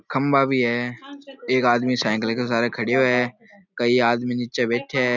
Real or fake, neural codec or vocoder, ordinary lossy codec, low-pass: real; none; none; 7.2 kHz